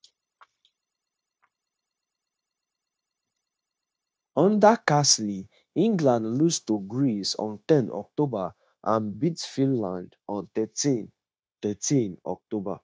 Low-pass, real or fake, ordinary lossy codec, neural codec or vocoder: none; fake; none; codec, 16 kHz, 0.9 kbps, LongCat-Audio-Codec